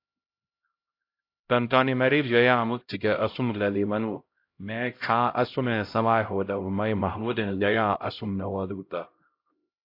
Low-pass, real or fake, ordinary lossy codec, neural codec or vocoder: 5.4 kHz; fake; AAC, 32 kbps; codec, 16 kHz, 0.5 kbps, X-Codec, HuBERT features, trained on LibriSpeech